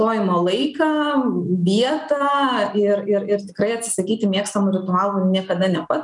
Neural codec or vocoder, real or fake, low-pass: none; real; 10.8 kHz